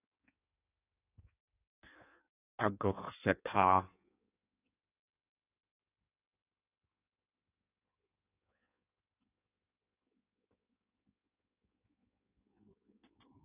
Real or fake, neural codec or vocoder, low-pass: fake; codec, 16 kHz in and 24 kHz out, 1.1 kbps, FireRedTTS-2 codec; 3.6 kHz